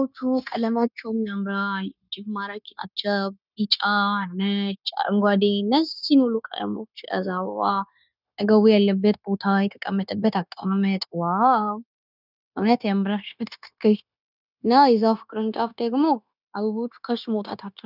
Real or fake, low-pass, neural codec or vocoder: fake; 5.4 kHz; codec, 16 kHz, 0.9 kbps, LongCat-Audio-Codec